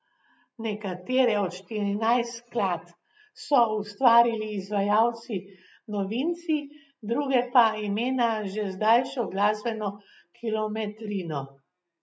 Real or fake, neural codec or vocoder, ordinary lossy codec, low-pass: real; none; none; none